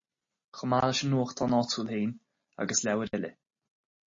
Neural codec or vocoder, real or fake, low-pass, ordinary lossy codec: none; real; 7.2 kHz; MP3, 32 kbps